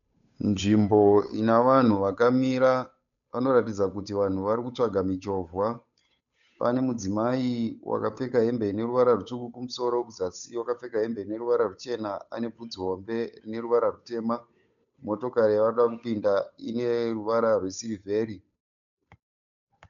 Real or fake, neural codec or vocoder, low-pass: fake; codec, 16 kHz, 8 kbps, FunCodec, trained on Chinese and English, 25 frames a second; 7.2 kHz